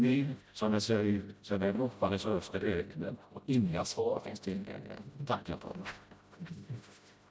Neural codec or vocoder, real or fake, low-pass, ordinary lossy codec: codec, 16 kHz, 0.5 kbps, FreqCodec, smaller model; fake; none; none